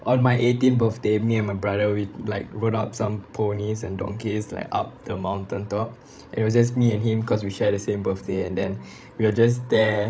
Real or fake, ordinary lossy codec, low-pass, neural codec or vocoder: fake; none; none; codec, 16 kHz, 16 kbps, FreqCodec, larger model